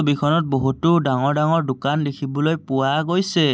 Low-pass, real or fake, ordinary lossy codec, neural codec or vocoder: none; real; none; none